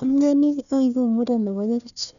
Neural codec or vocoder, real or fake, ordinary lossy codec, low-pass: codec, 16 kHz, 1 kbps, FunCodec, trained on Chinese and English, 50 frames a second; fake; none; 7.2 kHz